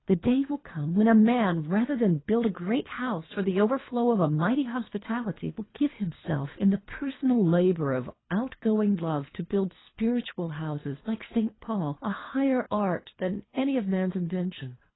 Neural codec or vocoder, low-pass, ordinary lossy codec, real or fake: codec, 24 kHz, 3 kbps, HILCodec; 7.2 kHz; AAC, 16 kbps; fake